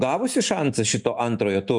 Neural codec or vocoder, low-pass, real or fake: none; 10.8 kHz; real